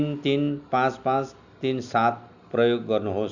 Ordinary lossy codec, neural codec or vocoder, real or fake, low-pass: none; none; real; 7.2 kHz